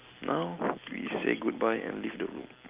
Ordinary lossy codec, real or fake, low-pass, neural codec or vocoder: Opus, 32 kbps; real; 3.6 kHz; none